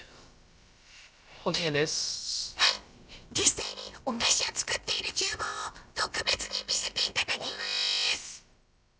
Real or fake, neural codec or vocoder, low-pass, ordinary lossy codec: fake; codec, 16 kHz, about 1 kbps, DyCAST, with the encoder's durations; none; none